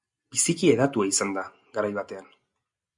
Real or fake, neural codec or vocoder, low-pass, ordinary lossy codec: real; none; 10.8 kHz; MP3, 64 kbps